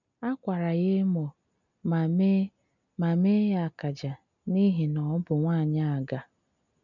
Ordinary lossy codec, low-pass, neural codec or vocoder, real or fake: none; 7.2 kHz; none; real